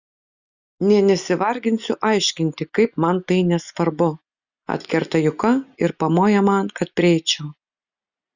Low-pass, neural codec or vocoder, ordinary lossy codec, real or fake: 7.2 kHz; none; Opus, 64 kbps; real